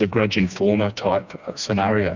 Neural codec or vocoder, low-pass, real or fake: codec, 16 kHz, 2 kbps, FreqCodec, smaller model; 7.2 kHz; fake